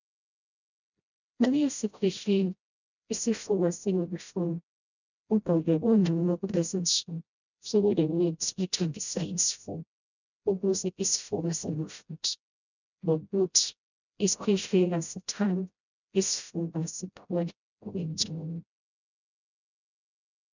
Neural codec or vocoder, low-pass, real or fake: codec, 16 kHz, 0.5 kbps, FreqCodec, smaller model; 7.2 kHz; fake